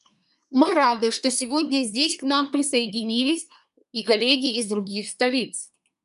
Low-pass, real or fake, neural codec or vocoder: 10.8 kHz; fake; codec, 24 kHz, 1 kbps, SNAC